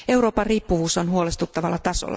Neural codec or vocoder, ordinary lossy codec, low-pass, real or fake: none; none; none; real